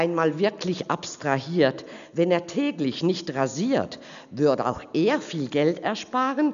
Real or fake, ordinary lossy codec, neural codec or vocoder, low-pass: real; none; none; 7.2 kHz